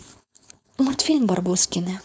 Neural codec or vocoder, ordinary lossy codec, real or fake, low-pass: codec, 16 kHz, 4.8 kbps, FACodec; none; fake; none